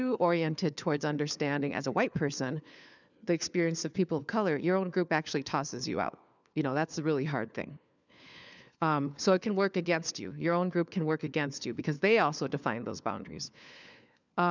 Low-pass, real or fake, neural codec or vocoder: 7.2 kHz; fake; codec, 16 kHz, 4 kbps, FunCodec, trained on LibriTTS, 50 frames a second